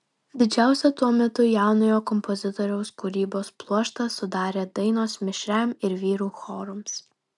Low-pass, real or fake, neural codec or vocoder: 10.8 kHz; real; none